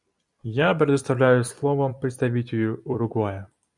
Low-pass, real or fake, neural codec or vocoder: 10.8 kHz; fake; vocoder, 44.1 kHz, 128 mel bands every 256 samples, BigVGAN v2